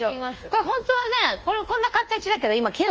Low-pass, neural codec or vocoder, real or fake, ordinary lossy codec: 7.2 kHz; codec, 24 kHz, 1.2 kbps, DualCodec; fake; Opus, 24 kbps